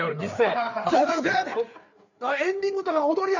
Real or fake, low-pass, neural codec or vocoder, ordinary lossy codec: fake; 7.2 kHz; codec, 16 kHz, 4 kbps, FreqCodec, larger model; none